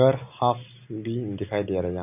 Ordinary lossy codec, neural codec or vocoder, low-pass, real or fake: none; none; 3.6 kHz; real